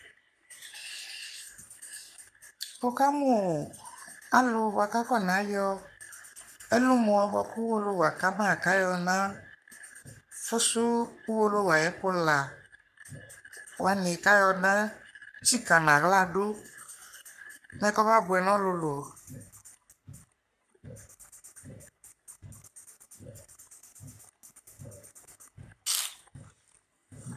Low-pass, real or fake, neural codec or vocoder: 14.4 kHz; fake; codec, 44.1 kHz, 3.4 kbps, Pupu-Codec